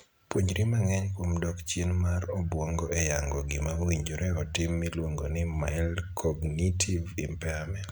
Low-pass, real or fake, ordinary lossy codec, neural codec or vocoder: none; real; none; none